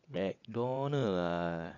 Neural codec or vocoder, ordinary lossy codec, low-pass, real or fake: vocoder, 22.05 kHz, 80 mel bands, WaveNeXt; none; 7.2 kHz; fake